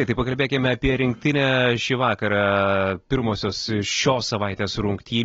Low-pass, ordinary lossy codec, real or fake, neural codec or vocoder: 7.2 kHz; AAC, 24 kbps; real; none